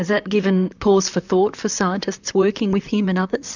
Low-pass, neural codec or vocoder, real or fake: 7.2 kHz; vocoder, 44.1 kHz, 128 mel bands, Pupu-Vocoder; fake